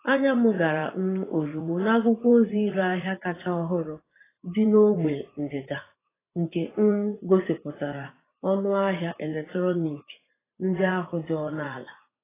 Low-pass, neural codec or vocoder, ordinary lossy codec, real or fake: 3.6 kHz; vocoder, 44.1 kHz, 80 mel bands, Vocos; AAC, 16 kbps; fake